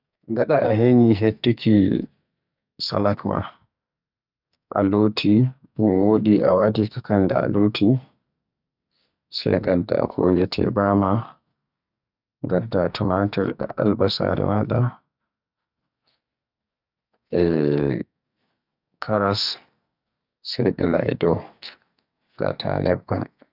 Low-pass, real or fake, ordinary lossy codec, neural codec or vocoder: 5.4 kHz; fake; none; codec, 44.1 kHz, 2.6 kbps, SNAC